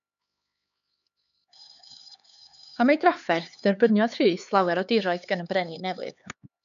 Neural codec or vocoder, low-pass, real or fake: codec, 16 kHz, 4 kbps, X-Codec, HuBERT features, trained on LibriSpeech; 7.2 kHz; fake